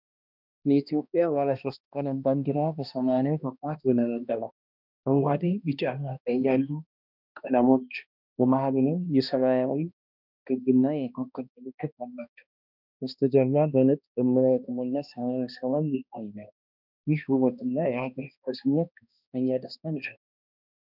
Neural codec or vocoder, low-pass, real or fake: codec, 16 kHz, 1 kbps, X-Codec, HuBERT features, trained on balanced general audio; 5.4 kHz; fake